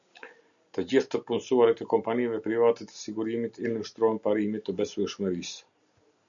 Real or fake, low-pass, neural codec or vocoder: real; 7.2 kHz; none